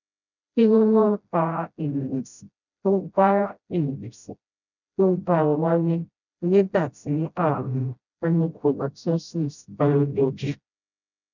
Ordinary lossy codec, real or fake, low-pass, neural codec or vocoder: none; fake; 7.2 kHz; codec, 16 kHz, 0.5 kbps, FreqCodec, smaller model